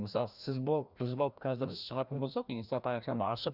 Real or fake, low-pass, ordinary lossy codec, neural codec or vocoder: fake; 5.4 kHz; none; codec, 16 kHz, 1 kbps, FreqCodec, larger model